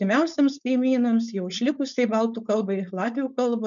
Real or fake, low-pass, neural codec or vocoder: fake; 7.2 kHz; codec, 16 kHz, 4.8 kbps, FACodec